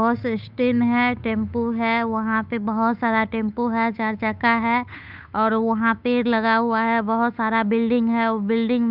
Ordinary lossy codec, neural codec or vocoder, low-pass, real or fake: none; codec, 16 kHz, 8 kbps, FunCodec, trained on Chinese and English, 25 frames a second; 5.4 kHz; fake